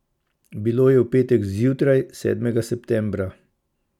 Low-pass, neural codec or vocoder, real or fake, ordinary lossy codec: 19.8 kHz; none; real; none